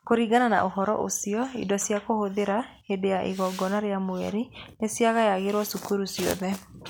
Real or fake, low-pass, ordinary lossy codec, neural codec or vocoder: real; none; none; none